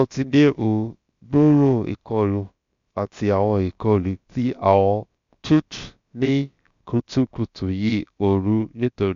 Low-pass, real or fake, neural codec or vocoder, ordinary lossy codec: 7.2 kHz; fake; codec, 16 kHz, about 1 kbps, DyCAST, with the encoder's durations; MP3, 64 kbps